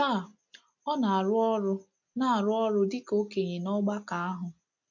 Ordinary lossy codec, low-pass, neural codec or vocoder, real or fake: none; 7.2 kHz; none; real